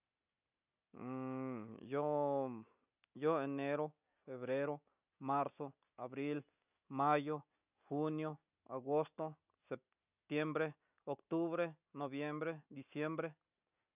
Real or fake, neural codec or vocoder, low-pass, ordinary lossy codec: real; none; 3.6 kHz; none